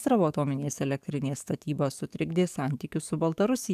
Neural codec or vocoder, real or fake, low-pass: codec, 44.1 kHz, 7.8 kbps, DAC; fake; 14.4 kHz